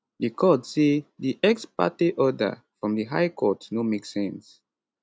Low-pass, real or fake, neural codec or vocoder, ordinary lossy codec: none; real; none; none